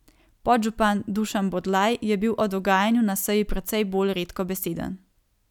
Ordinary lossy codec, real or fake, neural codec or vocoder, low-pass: none; real; none; 19.8 kHz